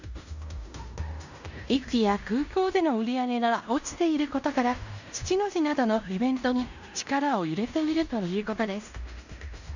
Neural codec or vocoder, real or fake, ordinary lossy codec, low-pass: codec, 16 kHz in and 24 kHz out, 0.9 kbps, LongCat-Audio-Codec, fine tuned four codebook decoder; fake; none; 7.2 kHz